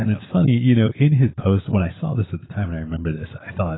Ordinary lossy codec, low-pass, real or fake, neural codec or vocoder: AAC, 16 kbps; 7.2 kHz; real; none